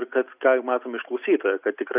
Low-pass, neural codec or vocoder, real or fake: 3.6 kHz; none; real